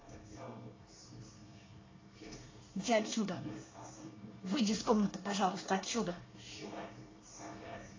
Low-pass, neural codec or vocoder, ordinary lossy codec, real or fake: 7.2 kHz; codec, 24 kHz, 1 kbps, SNAC; AAC, 32 kbps; fake